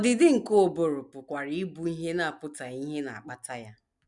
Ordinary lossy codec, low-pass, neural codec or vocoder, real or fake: none; 10.8 kHz; none; real